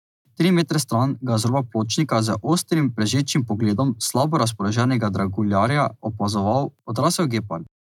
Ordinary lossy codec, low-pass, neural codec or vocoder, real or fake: none; 19.8 kHz; none; real